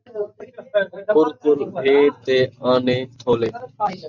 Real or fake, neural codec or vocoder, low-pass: real; none; 7.2 kHz